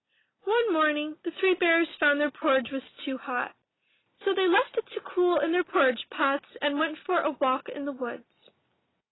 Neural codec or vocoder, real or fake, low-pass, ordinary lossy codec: none; real; 7.2 kHz; AAC, 16 kbps